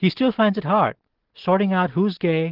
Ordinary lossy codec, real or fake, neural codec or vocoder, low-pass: Opus, 16 kbps; real; none; 5.4 kHz